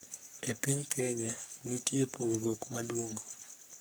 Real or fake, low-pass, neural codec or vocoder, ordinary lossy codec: fake; none; codec, 44.1 kHz, 3.4 kbps, Pupu-Codec; none